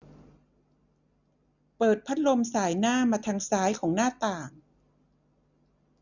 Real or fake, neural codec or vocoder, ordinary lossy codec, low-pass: real; none; none; 7.2 kHz